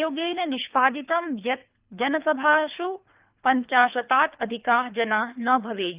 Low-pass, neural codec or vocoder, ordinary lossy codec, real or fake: 3.6 kHz; codec, 24 kHz, 3 kbps, HILCodec; Opus, 24 kbps; fake